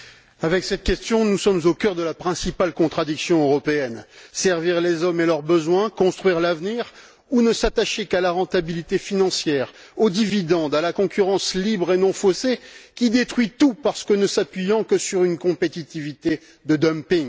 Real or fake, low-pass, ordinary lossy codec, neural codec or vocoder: real; none; none; none